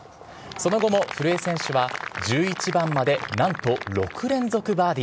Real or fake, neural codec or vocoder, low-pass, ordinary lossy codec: real; none; none; none